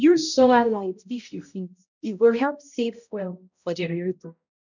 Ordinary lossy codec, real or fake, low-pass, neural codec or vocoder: none; fake; 7.2 kHz; codec, 16 kHz, 0.5 kbps, X-Codec, HuBERT features, trained on balanced general audio